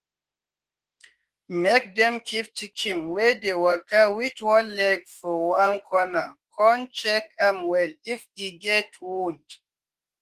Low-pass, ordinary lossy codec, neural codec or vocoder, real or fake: 14.4 kHz; Opus, 24 kbps; autoencoder, 48 kHz, 32 numbers a frame, DAC-VAE, trained on Japanese speech; fake